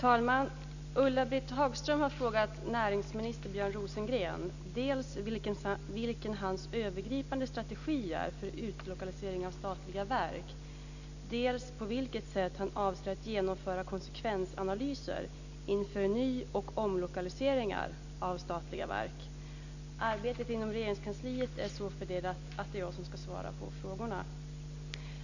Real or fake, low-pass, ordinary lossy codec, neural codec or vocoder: real; 7.2 kHz; none; none